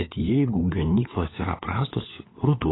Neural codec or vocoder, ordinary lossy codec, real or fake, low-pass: codec, 16 kHz, 4 kbps, FunCodec, trained on LibriTTS, 50 frames a second; AAC, 16 kbps; fake; 7.2 kHz